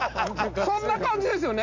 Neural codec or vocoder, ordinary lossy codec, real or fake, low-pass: none; AAC, 48 kbps; real; 7.2 kHz